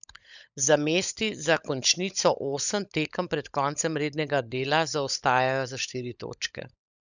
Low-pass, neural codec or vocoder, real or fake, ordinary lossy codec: 7.2 kHz; codec, 16 kHz, 16 kbps, FunCodec, trained on LibriTTS, 50 frames a second; fake; none